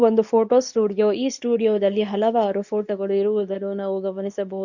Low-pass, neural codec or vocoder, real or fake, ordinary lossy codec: 7.2 kHz; codec, 24 kHz, 0.9 kbps, WavTokenizer, medium speech release version 2; fake; none